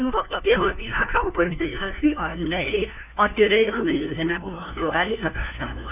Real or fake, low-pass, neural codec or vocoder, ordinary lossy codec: fake; 3.6 kHz; codec, 16 kHz, 1 kbps, FunCodec, trained on LibriTTS, 50 frames a second; none